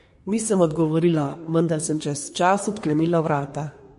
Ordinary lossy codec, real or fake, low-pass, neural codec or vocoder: MP3, 48 kbps; fake; 10.8 kHz; codec, 24 kHz, 1 kbps, SNAC